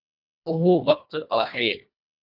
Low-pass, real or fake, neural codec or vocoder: 5.4 kHz; fake; codec, 24 kHz, 1.5 kbps, HILCodec